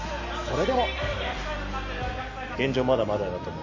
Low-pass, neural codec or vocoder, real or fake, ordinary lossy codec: 7.2 kHz; none; real; MP3, 48 kbps